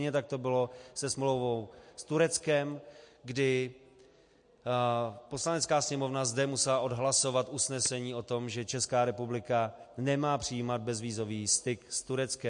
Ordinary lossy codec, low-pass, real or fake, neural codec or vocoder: MP3, 48 kbps; 9.9 kHz; real; none